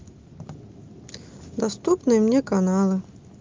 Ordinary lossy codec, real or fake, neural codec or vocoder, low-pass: Opus, 32 kbps; real; none; 7.2 kHz